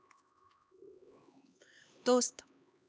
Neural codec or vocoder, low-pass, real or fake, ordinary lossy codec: codec, 16 kHz, 2 kbps, X-Codec, HuBERT features, trained on LibriSpeech; none; fake; none